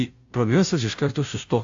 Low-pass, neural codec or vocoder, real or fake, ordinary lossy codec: 7.2 kHz; codec, 16 kHz, 0.5 kbps, FunCodec, trained on Chinese and English, 25 frames a second; fake; AAC, 64 kbps